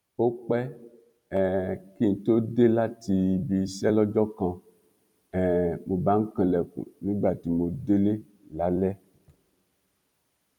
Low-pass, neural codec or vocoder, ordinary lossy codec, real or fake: 19.8 kHz; vocoder, 44.1 kHz, 128 mel bands every 256 samples, BigVGAN v2; none; fake